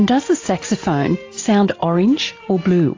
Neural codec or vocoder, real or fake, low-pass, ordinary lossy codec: none; real; 7.2 kHz; AAC, 32 kbps